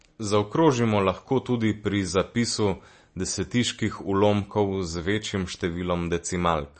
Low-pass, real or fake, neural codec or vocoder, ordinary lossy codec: 10.8 kHz; real; none; MP3, 32 kbps